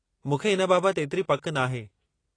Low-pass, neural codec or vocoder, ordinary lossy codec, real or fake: 9.9 kHz; none; AAC, 32 kbps; real